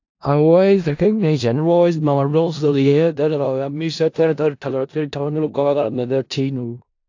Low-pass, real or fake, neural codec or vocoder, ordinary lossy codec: 7.2 kHz; fake; codec, 16 kHz in and 24 kHz out, 0.4 kbps, LongCat-Audio-Codec, four codebook decoder; AAC, 48 kbps